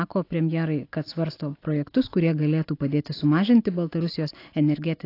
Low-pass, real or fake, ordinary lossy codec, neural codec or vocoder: 5.4 kHz; real; AAC, 32 kbps; none